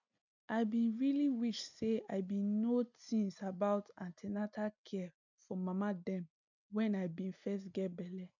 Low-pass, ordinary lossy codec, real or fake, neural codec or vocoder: 7.2 kHz; none; real; none